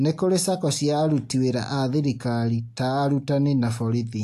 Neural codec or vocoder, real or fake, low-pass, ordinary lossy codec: none; real; 14.4 kHz; AAC, 64 kbps